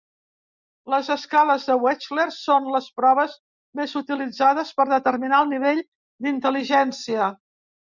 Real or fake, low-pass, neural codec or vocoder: real; 7.2 kHz; none